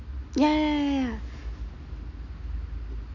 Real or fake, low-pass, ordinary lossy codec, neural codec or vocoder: real; 7.2 kHz; none; none